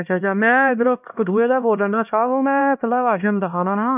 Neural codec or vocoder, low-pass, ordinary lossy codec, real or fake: codec, 16 kHz, 1 kbps, X-Codec, HuBERT features, trained on LibriSpeech; 3.6 kHz; none; fake